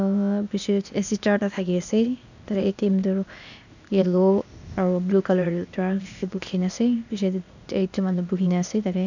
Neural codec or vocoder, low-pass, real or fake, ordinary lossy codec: codec, 16 kHz, 0.8 kbps, ZipCodec; 7.2 kHz; fake; none